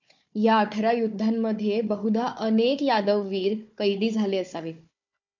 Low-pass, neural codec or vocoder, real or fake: 7.2 kHz; codec, 16 kHz, 4 kbps, FunCodec, trained on Chinese and English, 50 frames a second; fake